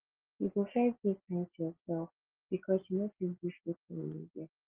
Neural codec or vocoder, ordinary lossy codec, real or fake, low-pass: none; Opus, 16 kbps; real; 3.6 kHz